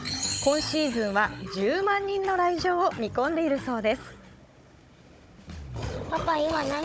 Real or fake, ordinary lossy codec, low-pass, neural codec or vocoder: fake; none; none; codec, 16 kHz, 16 kbps, FunCodec, trained on Chinese and English, 50 frames a second